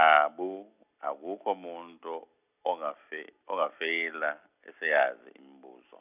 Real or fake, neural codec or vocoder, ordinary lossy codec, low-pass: real; none; none; 3.6 kHz